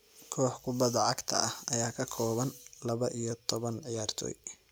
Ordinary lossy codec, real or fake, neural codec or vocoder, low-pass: none; real; none; none